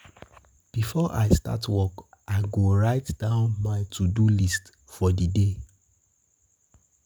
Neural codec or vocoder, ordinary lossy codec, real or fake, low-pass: none; none; real; none